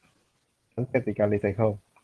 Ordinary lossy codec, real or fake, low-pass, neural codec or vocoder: Opus, 16 kbps; real; 10.8 kHz; none